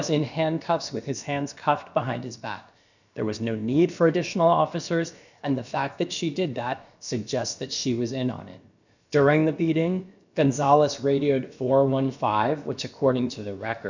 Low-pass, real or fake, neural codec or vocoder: 7.2 kHz; fake; codec, 16 kHz, about 1 kbps, DyCAST, with the encoder's durations